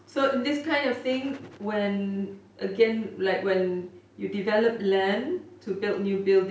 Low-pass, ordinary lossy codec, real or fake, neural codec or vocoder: none; none; real; none